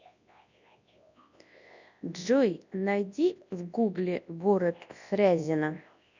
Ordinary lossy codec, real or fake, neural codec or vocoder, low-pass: none; fake; codec, 24 kHz, 0.9 kbps, WavTokenizer, large speech release; 7.2 kHz